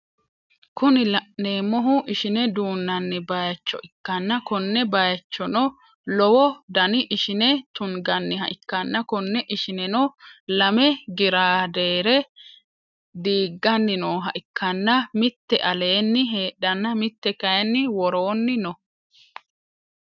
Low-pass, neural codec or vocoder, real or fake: 7.2 kHz; none; real